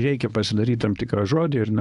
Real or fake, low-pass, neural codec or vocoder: fake; 10.8 kHz; codec, 24 kHz, 0.9 kbps, WavTokenizer, medium speech release version 1